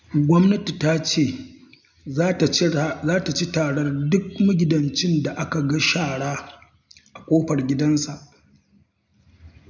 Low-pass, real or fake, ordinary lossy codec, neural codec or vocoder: 7.2 kHz; real; none; none